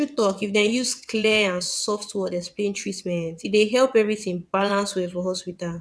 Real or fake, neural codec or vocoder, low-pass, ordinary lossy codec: fake; vocoder, 22.05 kHz, 80 mel bands, WaveNeXt; none; none